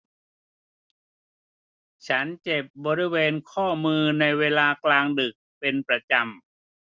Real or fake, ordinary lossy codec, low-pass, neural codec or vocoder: real; none; none; none